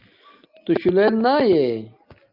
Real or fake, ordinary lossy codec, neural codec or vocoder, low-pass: real; Opus, 32 kbps; none; 5.4 kHz